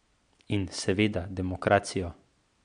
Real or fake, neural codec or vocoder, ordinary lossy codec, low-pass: real; none; MP3, 64 kbps; 9.9 kHz